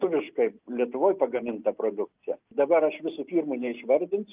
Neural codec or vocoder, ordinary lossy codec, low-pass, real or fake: none; Opus, 24 kbps; 3.6 kHz; real